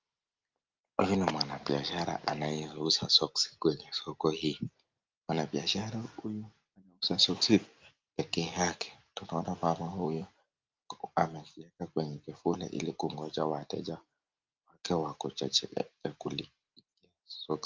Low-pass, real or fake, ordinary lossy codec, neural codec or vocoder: 7.2 kHz; real; Opus, 24 kbps; none